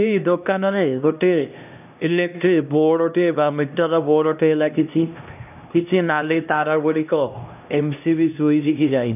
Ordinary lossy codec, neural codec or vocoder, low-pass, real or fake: none; codec, 16 kHz, 1 kbps, X-Codec, HuBERT features, trained on LibriSpeech; 3.6 kHz; fake